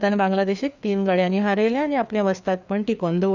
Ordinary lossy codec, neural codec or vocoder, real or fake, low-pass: none; codec, 16 kHz, 2 kbps, FreqCodec, larger model; fake; 7.2 kHz